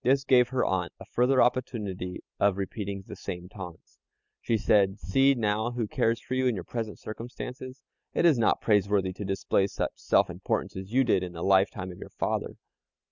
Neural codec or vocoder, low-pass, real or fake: none; 7.2 kHz; real